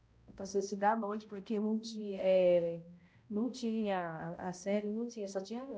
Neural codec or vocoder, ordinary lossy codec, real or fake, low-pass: codec, 16 kHz, 0.5 kbps, X-Codec, HuBERT features, trained on balanced general audio; none; fake; none